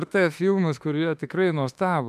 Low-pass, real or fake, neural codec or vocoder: 14.4 kHz; fake; autoencoder, 48 kHz, 32 numbers a frame, DAC-VAE, trained on Japanese speech